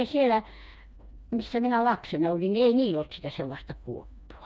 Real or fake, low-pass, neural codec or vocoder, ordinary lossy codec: fake; none; codec, 16 kHz, 2 kbps, FreqCodec, smaller model; none